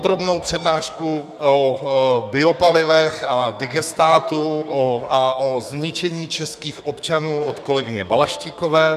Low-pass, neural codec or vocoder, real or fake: 14.4 kHz; codec, 32 kHz, 1.9 kbps, SNAC; fake